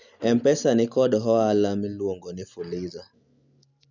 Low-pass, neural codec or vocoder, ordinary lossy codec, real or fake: 7.2 kHz; none; none; real